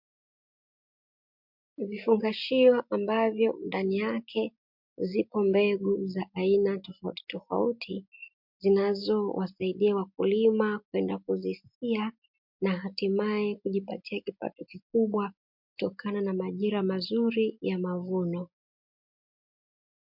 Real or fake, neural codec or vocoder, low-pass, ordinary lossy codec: real; none; 5.4 kHz; MP3, 48 kbps